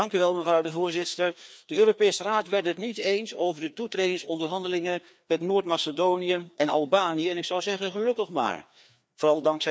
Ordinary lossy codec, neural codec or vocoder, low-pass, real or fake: none; codec, 16 kHz, 2 kbps, FreqCodec, larger model; none; fake